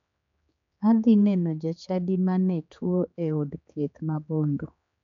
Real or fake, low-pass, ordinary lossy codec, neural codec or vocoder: fake; 7.2 kHz; MP3, 64 kbps; codec, 16 kHz, 4 kbps, X-Codec, HuBERT features, trained on general audio